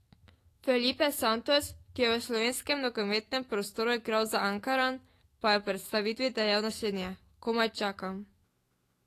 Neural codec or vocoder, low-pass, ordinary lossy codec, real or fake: autoencoder, 48 kHz, 128 numbers a frame, DAC-VAE, trained on Japanese speech; 14.4 kHz; AAC, 48 kbps; fake